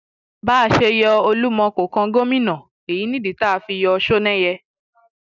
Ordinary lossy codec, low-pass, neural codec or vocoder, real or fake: none; 7.2 kHz; none; real